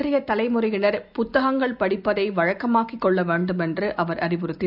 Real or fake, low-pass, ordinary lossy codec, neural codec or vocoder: real; 5.4 kHz; none; none